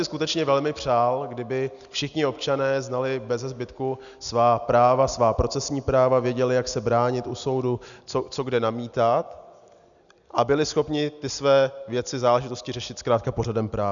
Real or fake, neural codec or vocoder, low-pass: real; none; 7.2 kHz